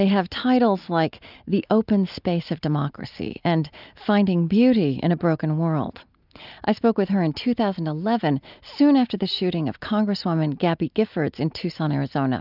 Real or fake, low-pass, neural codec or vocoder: real; 5.4 kHz; none